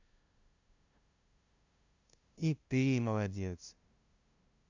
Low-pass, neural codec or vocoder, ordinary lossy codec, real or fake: 7.2 kHz; codec, 16 kHz, 0.5 kbps, FunCodec, trained on LibriTTS, 25 frames a second; Opus, 64 kbps; fake